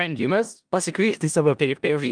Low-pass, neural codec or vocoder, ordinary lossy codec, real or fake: 9.9 kHz; codec, 16 kHz in and 24 kHz out, 0.4 kbps, LongCat-Audio-Codec, four codebook decoder; Opus, 32 kbps; fake